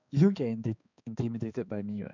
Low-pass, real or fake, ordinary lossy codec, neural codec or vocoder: 7.2 kHz; fake; none; codec, 16 kHz, 2 kbps, X-Codec, HuBERT features, trained on balanced general audio